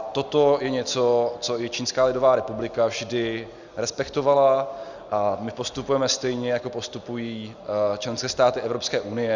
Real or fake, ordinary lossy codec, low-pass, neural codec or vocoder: real; Opus, 64 kbps; 7.2 kHz; none